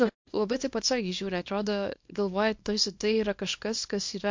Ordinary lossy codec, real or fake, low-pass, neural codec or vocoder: MP3, 48 kbps; fake; 7.2 kHz; codec, 24 kHz, 0.9 kbps, WavTokenizer, small release